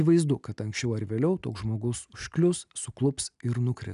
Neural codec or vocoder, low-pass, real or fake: none; 10.8 kHz; real